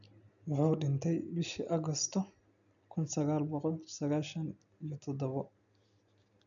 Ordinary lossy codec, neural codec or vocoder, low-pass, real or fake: none; none; 7.2 kHz; real